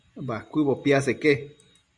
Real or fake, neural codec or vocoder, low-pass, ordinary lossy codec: fake; vocoder, 44.1 kHz, 128 mel bands every 512 samples, BigVGAN v2; 10.8 kHz; Opus, 64 kbps